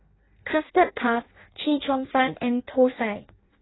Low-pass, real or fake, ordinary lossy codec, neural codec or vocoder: 7.2 kHz; fake; AAC, 16 kbps; codec, 16 kHz in and 24 kHz out, 0.6 kbps, FireRedTTS-2 codec